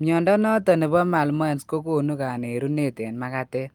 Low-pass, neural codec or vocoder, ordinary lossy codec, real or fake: 19.8 kHz; none; Opus, 32 kbps; real